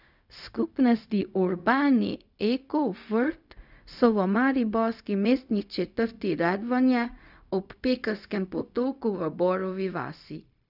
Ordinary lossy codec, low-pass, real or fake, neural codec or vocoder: none; 5.4 kHz; fake; codec, 16 kHz, 0.4 kbps, LongCat-Audio-Codec